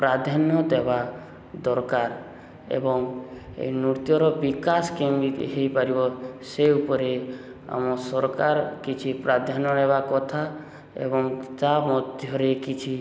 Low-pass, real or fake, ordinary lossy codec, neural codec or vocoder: none; real; none; none